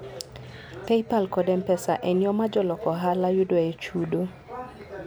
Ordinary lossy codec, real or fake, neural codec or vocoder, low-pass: none; real; none; none